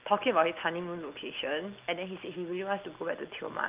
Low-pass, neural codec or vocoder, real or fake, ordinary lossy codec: 3.6 kHz; none; real; Opus, 32 kbps